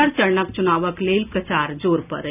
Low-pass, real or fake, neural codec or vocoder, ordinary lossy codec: 3.6 kHz; real; none; none